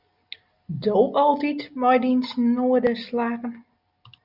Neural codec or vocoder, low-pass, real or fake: none; 5.4 kHz; real